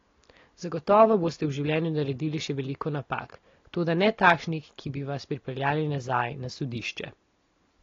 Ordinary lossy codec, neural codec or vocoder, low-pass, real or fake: AAC, 32 kbps; none; 7.2 kHz; real